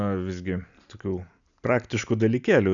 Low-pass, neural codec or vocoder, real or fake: 7.2 kHz; none; real